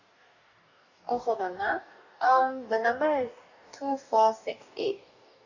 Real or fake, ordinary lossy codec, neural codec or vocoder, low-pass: fake; none; codec, 44.1 kHz, 2.6 kbps, DAC; 7.2 kHz